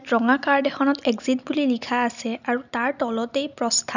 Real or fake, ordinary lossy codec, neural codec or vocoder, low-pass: real; none; none; 7.2 kHz